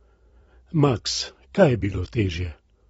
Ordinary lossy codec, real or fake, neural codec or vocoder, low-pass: AAC, 24 kbps; fake; vocoder, 44.1 kHz, 128 mel bands every 256 samples, BigVGAN v2; 19.8 kHz